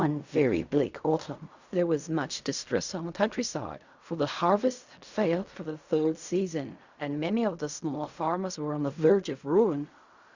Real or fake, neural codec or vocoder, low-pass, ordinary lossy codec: fake; codec, 16 kHz in and 24 kHz out, 0.4 kbps, LongCat-Audio-Codec, fine tuned four codebook decoder; 7.2 kHz; Opus, 64 kbps